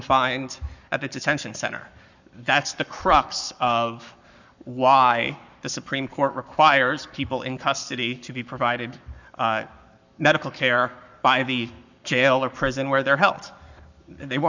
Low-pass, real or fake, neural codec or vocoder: 7.2 kHz; fake; codec, 44.1 kHz, 7.8 kbps, Pupu-Codec